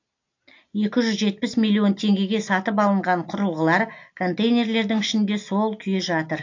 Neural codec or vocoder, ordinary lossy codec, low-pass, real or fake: none; AAC, 48 kbps; 7.2 kHz; real